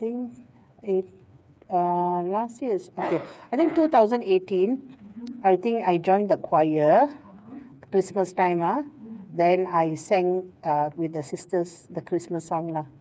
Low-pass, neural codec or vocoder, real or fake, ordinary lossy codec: none; codec, 16 kHz, 4 kbps, FreqCodec, smaller model; fake; none